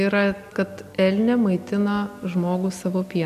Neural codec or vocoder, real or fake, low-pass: none; real; 14.4 kHz